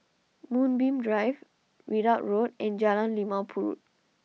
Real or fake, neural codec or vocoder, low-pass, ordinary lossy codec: real; none; none; none